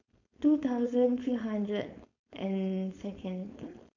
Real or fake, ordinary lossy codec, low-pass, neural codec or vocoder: fake; none; 7.2 kHz; codec, 16 kHz, 4.8 kbps, FACodec